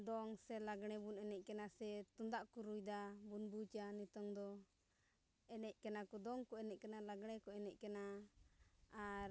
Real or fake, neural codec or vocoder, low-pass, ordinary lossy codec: real; none; none; none